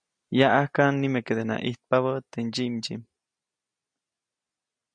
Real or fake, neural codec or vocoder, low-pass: real; none; 9.9 kHz